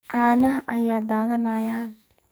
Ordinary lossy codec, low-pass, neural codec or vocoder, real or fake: none; none; codec, 44.1 kHz, 2.6 kbps, SNAC; fake